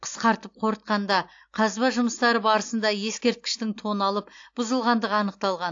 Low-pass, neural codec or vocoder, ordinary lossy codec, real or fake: 7.2 kHz; none; AAC, 48 kbps; real